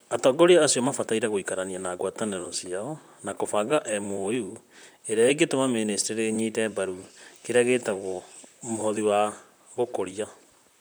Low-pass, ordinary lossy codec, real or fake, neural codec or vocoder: none; none; fake; vocoder, 44.1 kHz, 128 mel bands, Pupu-Vocoder